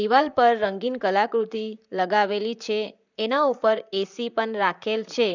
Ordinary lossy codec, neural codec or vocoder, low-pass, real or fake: none; vocoder, 44.1 kHz, 128 mel bands, Pupu-Vocoder; 7.2 kHz; fake